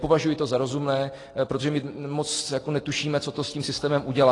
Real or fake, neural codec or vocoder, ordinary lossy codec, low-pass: real; none; AAC, 32 kbps; 10.8 kHz